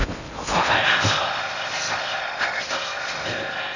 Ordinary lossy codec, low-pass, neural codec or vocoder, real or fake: none; 7.2 kHz; codec, 16 kHz in and 24 kHz out, 0.6 kbps, FocalCodec, streaming, 2048 codes; fake